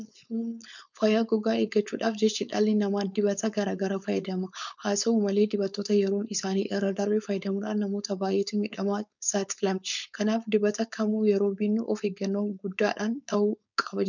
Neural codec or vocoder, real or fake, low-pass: codec, 16 kHz, 4.8 kbps, FACodec; fake; 7.2 kHz